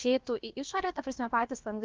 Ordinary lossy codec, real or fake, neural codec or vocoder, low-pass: Opus, 24 kbps; fake; codec, 16 kHz, about 1 kbps, DyCAST, with the encoder's durations; 7.2 kHz